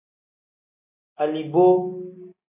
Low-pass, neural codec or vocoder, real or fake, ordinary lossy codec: 3.6 kHz; none; real; AAC, 32 kbps